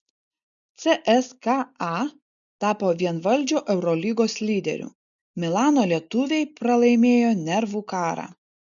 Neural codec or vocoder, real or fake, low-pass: none; real; 7.2 kHz